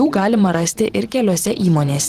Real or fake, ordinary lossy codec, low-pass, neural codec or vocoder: fake; Opus, 16 kbps; 14.4 kHz; vocoder, 44.1 kHz, 128 mel bands every 512 samples, BigVGAN v2